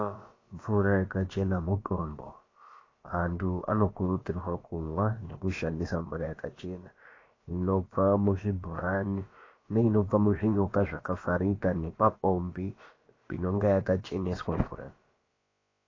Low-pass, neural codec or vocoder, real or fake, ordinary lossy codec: 7.2 kHz; codec, 16 kHz, about 1 kbps, DyCAST, with the encoder's durations; fake; AAC, 32 kbps